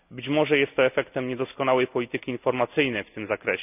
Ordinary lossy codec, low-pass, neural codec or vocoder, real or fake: none; 3.6 kHz; none; real